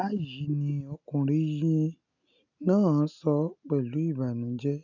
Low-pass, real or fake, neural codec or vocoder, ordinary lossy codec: 7.2 kHz; real; none; none